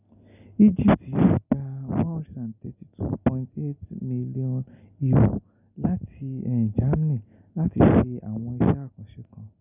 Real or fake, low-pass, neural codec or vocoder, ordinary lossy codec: real; 3.6 kHz; none; none